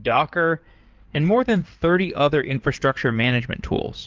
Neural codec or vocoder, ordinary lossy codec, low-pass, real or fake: codec, 16 kHz in and 24 kHz out, 2.2 kbps, FireRedTTS-2 codec; Opus, 24 kbps; 7.2 kHz; fake